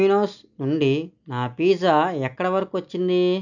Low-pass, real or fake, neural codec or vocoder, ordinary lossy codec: 7.2 kHz; real; none; none